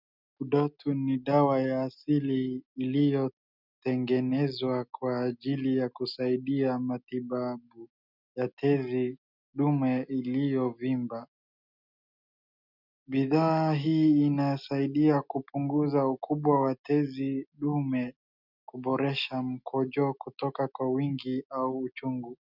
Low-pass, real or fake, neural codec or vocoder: 5.4 kHz; real; none